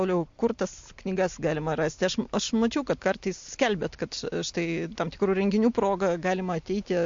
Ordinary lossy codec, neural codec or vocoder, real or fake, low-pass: MP3, 48 kbps; none; real; 7.2 kHz